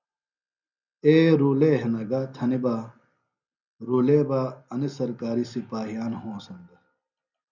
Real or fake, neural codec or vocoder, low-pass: real; none; 7.2 kHz